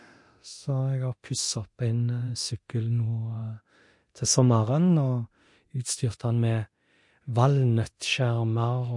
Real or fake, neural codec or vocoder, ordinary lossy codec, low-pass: fake; codec, 24 kHz, 0.9 kbps, DualCodec; MP3, 48 kbps; 10.8 kHz